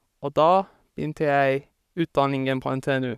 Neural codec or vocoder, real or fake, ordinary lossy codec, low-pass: codec, 44.1 kHz, 7.8 kbps, Pupu-Codec; fake; none; 14.4 kHz